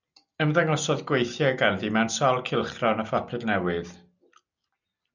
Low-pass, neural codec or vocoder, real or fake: 7.2 kHz; none; real